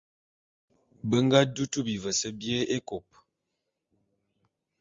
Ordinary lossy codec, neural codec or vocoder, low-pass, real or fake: Opus, 32 kbps; none; 7.2 kHz; real